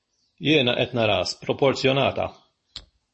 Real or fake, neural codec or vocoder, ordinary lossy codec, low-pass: real; none; MP3, 32 kbps; 10.8 kHz